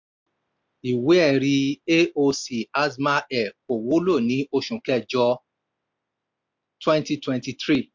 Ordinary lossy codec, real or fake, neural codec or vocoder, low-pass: MP3, 64 kbps; real; none; 7.2 kHz